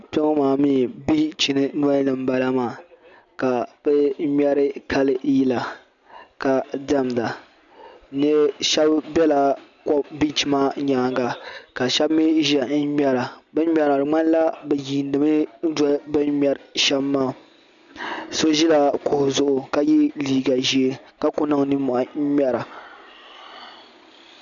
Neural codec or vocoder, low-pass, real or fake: none; 7.2 kHz; real